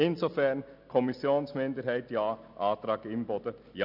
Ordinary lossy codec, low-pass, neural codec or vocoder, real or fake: none; 5.4 kHz; none; real